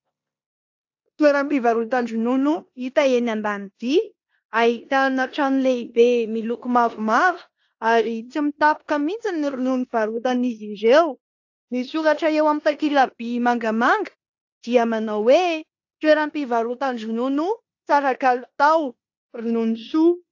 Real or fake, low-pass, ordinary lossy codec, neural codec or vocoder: fake; 7.2 kHz; AAC, 48 kbps; codec, 16 kHz in and 24 kHz out, 0.9 kbps, LongCat-Audio-Codec, four codebook decoder